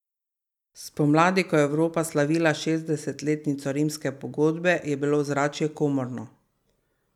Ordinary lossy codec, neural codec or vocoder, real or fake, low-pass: none; none; real; 19.8 kHz